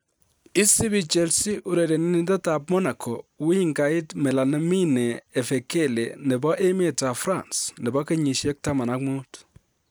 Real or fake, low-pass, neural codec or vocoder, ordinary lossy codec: real; none; none; none